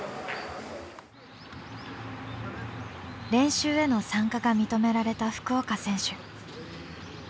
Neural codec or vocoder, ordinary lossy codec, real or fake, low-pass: none; none; real; none